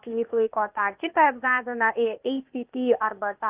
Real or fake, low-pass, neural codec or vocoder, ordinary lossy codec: fake; 3.6 kHz; codec, 16 kHz, 0.8 kbps, ZipCodec; Opus, 24 kbps